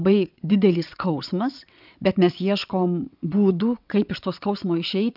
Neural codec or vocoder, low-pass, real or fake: vocoder, 22.05 kHz, 80 mel bands, WaveNeXt; 5.4 kHz; fake